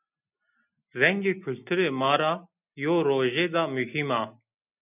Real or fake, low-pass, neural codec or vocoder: real; 3.6 kHz; none